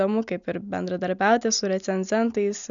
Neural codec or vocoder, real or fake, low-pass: none; real; 7.2 kHz